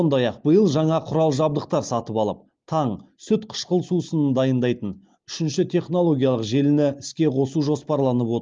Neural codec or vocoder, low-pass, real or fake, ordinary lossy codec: none; 7.2 kHz; real; Opus, 32 kbps